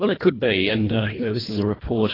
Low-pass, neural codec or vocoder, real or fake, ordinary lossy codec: 5.4 kHz; codec, 24 kHz, 1.5 kbps, HILCodec; fake; AAC, 24 kbps